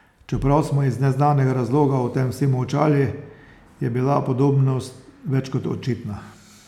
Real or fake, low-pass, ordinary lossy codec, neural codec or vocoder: real; 19.8 kHz; none; none